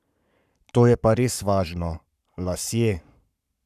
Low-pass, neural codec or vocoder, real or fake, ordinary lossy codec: 14.4 kHz; codec, 44.1 kHz, 7.8 kbps, Pupu-Codec; fake; none